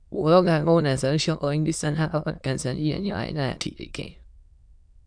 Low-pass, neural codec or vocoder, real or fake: 9.9 kHz; autoencoder, 22.05 kHz, a latent of 192 numbers a frame, VITS, trained on many speakers; fake